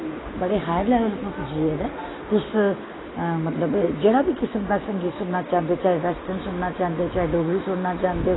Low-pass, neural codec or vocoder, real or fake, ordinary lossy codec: 7.2 kHz; none; real; AAC, 16 kbps